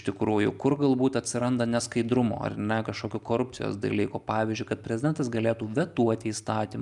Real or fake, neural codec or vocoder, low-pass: real; none; 10.8 kHz